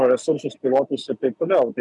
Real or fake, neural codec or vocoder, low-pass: real; none; 10.8 kHz